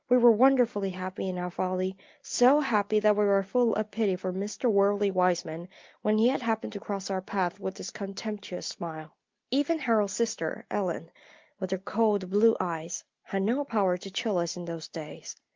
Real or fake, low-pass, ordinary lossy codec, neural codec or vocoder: real; 7.2 kHz; Opus, 32 kbps; none